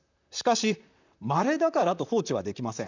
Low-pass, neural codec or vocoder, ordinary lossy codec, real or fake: 7.2 kHz; vocoder, 44.1 kHz, 128 mel bands, Pupu-Vocoder; none; fake